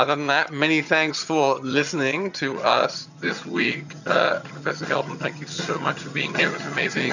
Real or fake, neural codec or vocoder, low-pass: fake; vocoder, 22.05 kHz, 80 mel bands, HiFi-GAN; 7.2 kHz